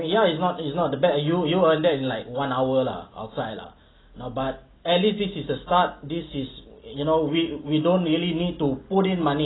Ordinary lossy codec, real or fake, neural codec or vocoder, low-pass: AAC, 16 kbps; real; none; 7.2 kHz